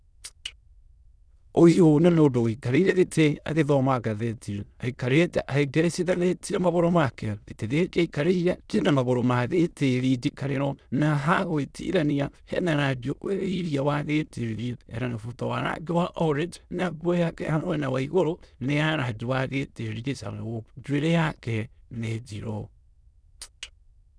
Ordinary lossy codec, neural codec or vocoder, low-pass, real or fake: none; autoencoder, 22.05 kHz, a latent of 192 numbers a frame, VITS, trained on many speakers; none; fake